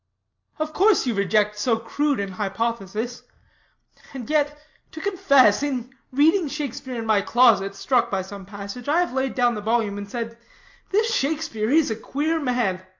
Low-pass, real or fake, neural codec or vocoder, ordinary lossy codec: 7.2 kHz; real; none; MP3, 48 kbps